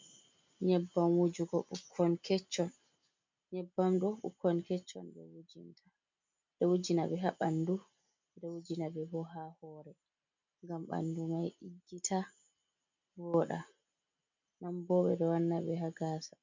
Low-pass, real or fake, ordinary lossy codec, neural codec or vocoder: 7.2 kHz; real; MP3, 64 kbps; none